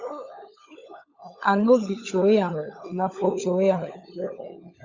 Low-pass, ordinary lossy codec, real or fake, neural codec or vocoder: 7.2 kHz; Opus, 64 kbps; fake; codec, 16 kHz, 16 kbps, FunCodec, trained on LibriTTS, 50 frames a second